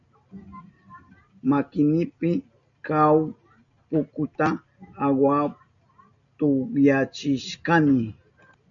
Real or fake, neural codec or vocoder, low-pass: real; none; 7.2 kHz